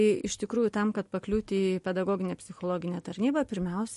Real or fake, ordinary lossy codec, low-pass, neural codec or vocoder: real; MP3, 48 kbps; 14.4 kHz; none